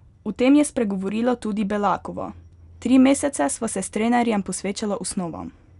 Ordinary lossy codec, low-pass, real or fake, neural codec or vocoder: none; 10.8 kHz; real; none